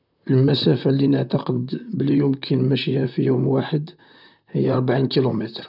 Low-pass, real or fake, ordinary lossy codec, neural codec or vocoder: 5.4 kHz; fake; none; vocoder, 44.1 kHz, 128 mel bands, Pupu-Vocoder